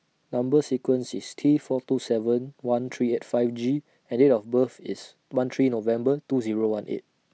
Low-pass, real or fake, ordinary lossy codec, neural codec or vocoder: none; real; none; none